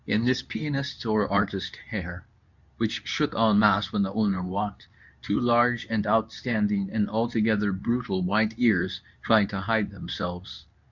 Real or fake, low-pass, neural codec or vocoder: fake; 7.2 kHz; codec, 24 kHz, 0.9 kbps, WavTokenizer, medium speech release version 2